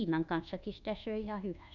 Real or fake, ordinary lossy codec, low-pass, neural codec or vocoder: fake; none; 7.2 kHz; codec, 24 kHz, 1.2 kbps, DualCodec